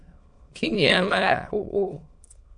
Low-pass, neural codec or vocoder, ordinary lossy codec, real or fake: 9.9 kHz; autoencoder, 22.05 kHz, a latent of 192 numbers a frame, VITS, trained on many speakers; AAC, 64 kbps; fake